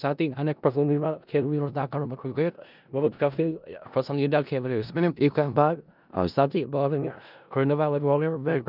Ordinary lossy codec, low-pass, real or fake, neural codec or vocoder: none; 5.4 kHz; fake; codec, 16 kHz in and 24 kHz out, 0.4 kbps, LongCat-Audio-Codec, four codebook decoder